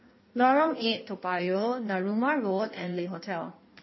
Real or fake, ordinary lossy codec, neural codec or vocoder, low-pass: fake; MP3, 24 kbps; codec, 16 kHz in and 24 kHz out, 1.1 kbps, FireRedTTS-2 codec; 7.2 kHz